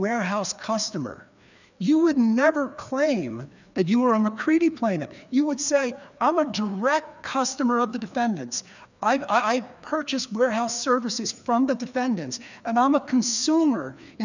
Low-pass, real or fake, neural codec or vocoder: 7.2 kHz; fake; codec, 16 kHz, 2 kbps, FreqCodec, larger model